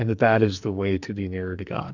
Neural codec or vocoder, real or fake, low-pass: codec, 44.1 kHz, 2.6 kbps, SNAC; fake; 7.2 kHz